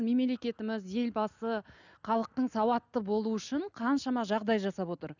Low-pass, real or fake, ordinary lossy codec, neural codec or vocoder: 7.2 kHz; real; none; none